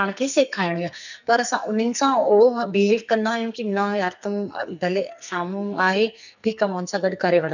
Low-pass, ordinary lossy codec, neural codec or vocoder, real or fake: 7.2 kHz; none; codec, 44.1 kHz, 2.6 kbps, SNAC; fake